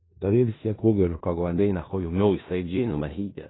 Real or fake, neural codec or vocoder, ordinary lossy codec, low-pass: fake; codec, 16 kHz in and 24 kHz out, 0.4 kbps, LongCat-Audio-Codec, four codebook decoder; AAC, 16 kbps; 7.2 kHz